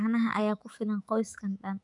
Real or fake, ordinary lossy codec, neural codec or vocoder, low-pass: fake; none; autoencoder, 48 kHz, 128 numbers a frame, DAC-VAE, trained on Japanese speech; 10.8 kHz